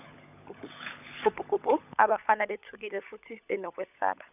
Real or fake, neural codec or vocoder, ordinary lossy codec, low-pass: fake; codec, 16 kHz, 16 kbps, FunCodec, trained on LibriTTS, 50 frames a second; none; 3.6 kHz